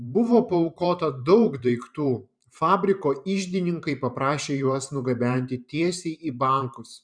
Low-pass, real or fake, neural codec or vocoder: 9.9 kHz; fake; vocoder, 44.1 kHz, 128 mel bands every 512 samples, BigVGAN v2